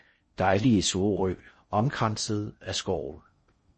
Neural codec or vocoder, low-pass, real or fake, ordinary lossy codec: codec, 16 kHz in and 24 kHz out, 0.6 kbps, FocalCodec, streaming, 4096 codes; 10.8 kHz; fake; MP3, 32 kbps